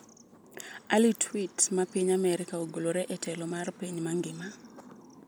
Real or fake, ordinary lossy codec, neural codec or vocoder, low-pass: real; none; none; none